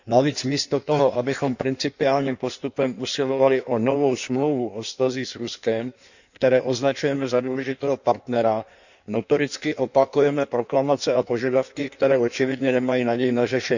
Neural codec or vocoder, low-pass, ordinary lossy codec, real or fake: codec, 16 kHz in and 24 kHz out, 1.1 kbps, FireRedTTS-2 codec; 7.2 kHz; none; fake